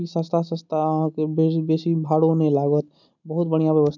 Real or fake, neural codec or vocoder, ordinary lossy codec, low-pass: real; none; none; 7.2 kHz